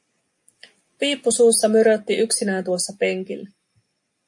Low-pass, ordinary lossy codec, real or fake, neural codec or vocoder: 10.8 kHz; MP3, 48 kbps; real; none